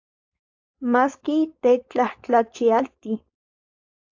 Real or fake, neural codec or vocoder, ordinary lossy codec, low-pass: fake; codec, 16 kHz, 4.8 kbps, FACodec; AAC, 48 kbps; 7.2 kHz